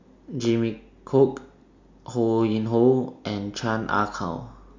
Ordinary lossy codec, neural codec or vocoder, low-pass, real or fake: MP3, 48 kbps; none; 7.2 kHz; real